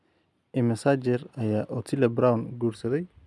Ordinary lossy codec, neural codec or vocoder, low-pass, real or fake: none; none; none; real